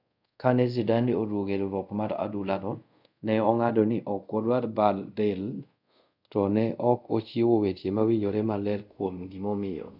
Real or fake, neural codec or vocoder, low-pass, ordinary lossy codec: fake; codec, 24 kHz, 0.5 kbps, DualCodec; 5.4 kHz; none